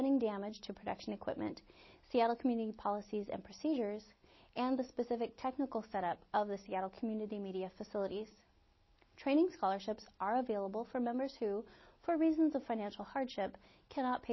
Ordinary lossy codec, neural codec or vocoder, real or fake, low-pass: MP3, 24 kbps; none; real; 7.2 kHz